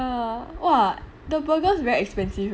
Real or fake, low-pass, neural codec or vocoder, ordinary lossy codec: real; none; none; none